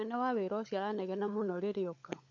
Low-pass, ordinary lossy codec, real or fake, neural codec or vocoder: 7.2 kHz; none; fake; codec, 16 kHz, 16 kbps, FunCodec, trained on LibriTTS, 50 frames a second